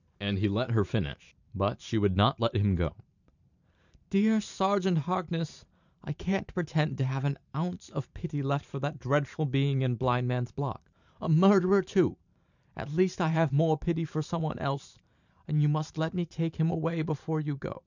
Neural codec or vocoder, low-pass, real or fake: vocoder, 44.1 kHz, 128 mel bands every 512 samples, BigVGAN v2; 7.2 kHz; fake